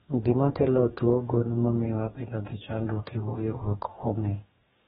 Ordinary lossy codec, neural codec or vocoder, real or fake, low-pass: AAC, 16 kbps; codec, 44.1 kHz, 2.6 kbps, DAC; fake; 19.8 kHz